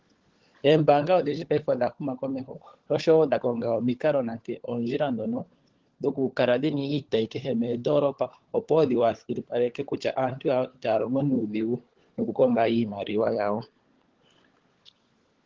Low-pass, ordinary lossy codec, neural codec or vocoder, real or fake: 7.2 kHz; Opus, 16 kbps; codec, 16 kHz, 16 kbps, FunCodec, trained on LibriTTS, 50 frames a second; fake